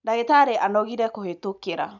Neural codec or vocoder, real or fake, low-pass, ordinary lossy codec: none; real; 7.2 kHz; none